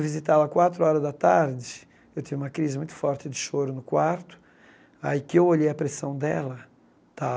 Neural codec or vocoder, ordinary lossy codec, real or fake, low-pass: none; none; real; none